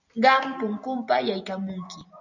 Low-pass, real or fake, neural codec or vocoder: 7.2 kHz; real; none